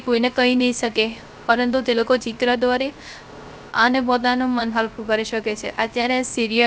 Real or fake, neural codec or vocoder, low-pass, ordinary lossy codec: fake; codec, 16 kHz, 0.3 kbps, FocalCodec; none; none